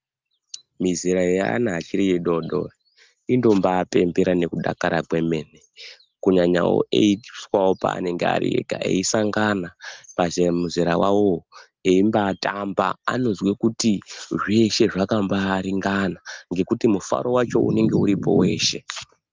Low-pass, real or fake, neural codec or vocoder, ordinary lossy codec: 7.2 kHz; real; none; Opus, 32 kbps